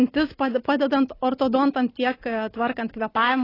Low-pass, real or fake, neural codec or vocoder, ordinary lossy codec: 5.4 kHz; real; none; AAC, 24 kbps